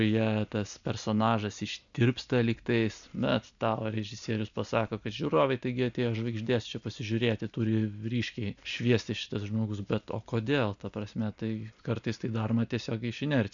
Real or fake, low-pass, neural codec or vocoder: real; 7.2 kHz; none